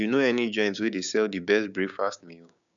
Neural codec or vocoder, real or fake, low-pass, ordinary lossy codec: codec, 16 kHz, 6 kbps, DAC; fake; 7.2 kHz; none